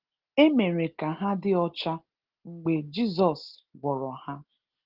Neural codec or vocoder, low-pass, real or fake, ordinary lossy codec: none; 5.4 kHz; real; Opus, 16 kbps